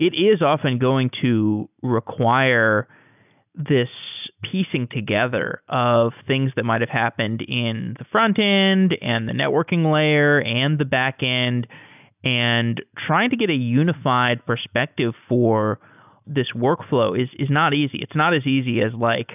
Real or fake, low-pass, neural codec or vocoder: real; 3.6 kHz; none